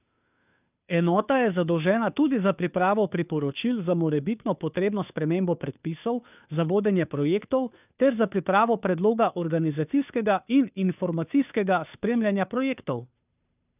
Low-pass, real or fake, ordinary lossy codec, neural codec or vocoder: 3.6 kHz; fake; none; codec, 16 kHz, 2 kbps, FunCodec, trained on Chinese and English, 25 frames a second